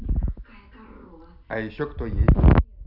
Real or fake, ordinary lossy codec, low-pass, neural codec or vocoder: real; none; 5.4 kHz; none